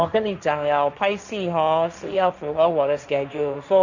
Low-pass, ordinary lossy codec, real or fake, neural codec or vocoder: 7.2 kHz; none; fake; codec, 16 kHz, 1.1 kbps, Voila-Tokenizer